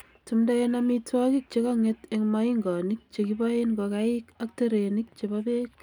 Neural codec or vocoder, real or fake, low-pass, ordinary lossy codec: none; real; 19.8 kHz; none